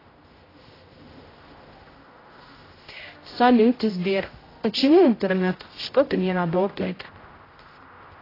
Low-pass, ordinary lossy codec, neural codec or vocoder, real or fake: 5.4 kHz; AAC, 24 kbps; codec, 16 kHz, 0.5 kbps, X-Codec, HuBERT features, trained on general audio; fake